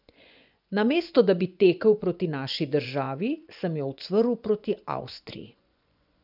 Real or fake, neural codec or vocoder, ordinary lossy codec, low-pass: fake; vocoder, 24 kHz, 100 mel bands, Vocos; none; 5.4 kHz